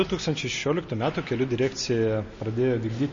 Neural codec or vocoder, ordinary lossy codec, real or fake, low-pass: none; MP3, 32 kbps; real; 7.2 kHz